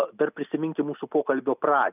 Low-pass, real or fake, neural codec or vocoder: 3.6 kHz; real; none